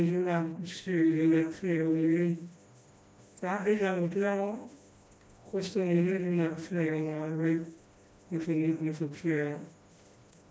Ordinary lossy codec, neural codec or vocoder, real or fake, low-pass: none; codec, 16 kHz, 1 kbps, FreqCodec, smaller model; fake; none